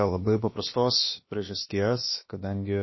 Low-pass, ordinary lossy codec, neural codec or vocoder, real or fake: 7.2 kHz; MP3, 24 kbps; codec, 16 kHz, about 1 kbps, DyCAST, with the encoder's durations; fake